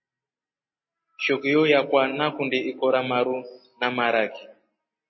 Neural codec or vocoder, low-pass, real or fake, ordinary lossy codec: none; 7.2 kHz; real; MP3, 24 kbps